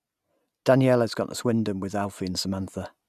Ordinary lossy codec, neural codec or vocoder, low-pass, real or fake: none; none; 14.4 kHz; real